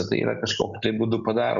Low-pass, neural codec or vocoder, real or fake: 7.2 kHz; none; real